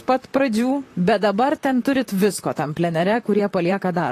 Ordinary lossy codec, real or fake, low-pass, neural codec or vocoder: AAC, 48 kbps; fake; 14.4 kHz; vocoder, 44.1 kHz, 128 mel bands, Pupu-Vocoder